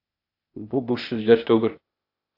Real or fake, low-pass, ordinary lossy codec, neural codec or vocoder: fake; 5.4 kHz; AAC, 32 kbps; codec, 16 kHz, 0.8 kbps, ZipCodec